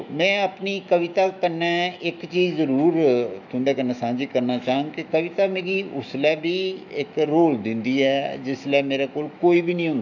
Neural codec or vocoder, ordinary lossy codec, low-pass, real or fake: autoencoder, 48 kHz, 128 numbers a frame, DAC-VAE, trained on Japanese speech; none; 7.2 kHz; fake